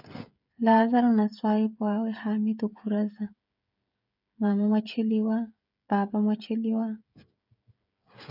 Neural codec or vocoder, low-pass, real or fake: codec, 16 kHz, 16 kbps, FreqCodec, smaller model; 5.4 kHz; fake